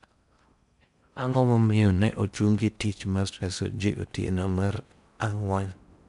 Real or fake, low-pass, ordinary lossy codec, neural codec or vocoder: fake; 10.8 kHz; none; codec, 16 kHz in and 24 kHz out, 0.6 kbps, FocalCodec, streaming, 4096 codes